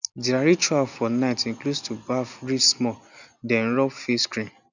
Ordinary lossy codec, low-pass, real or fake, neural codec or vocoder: none; 7.2 kHz; real; none